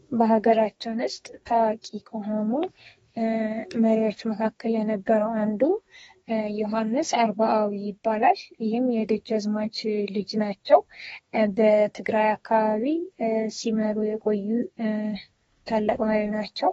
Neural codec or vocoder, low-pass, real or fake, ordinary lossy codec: codec, 32 kHz, 1.9 kbps, SNAC; 14.4 kHz; fake; AAC, 24 kbps